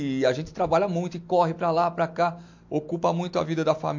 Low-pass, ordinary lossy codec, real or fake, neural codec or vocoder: 7.2 kHz; MP3, 48 kbps; real; none